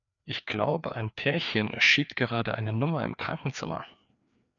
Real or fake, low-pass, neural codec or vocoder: fake; 7.2 kHz; codec, 16 kHz, 2 kbps, FreqCodec, larger model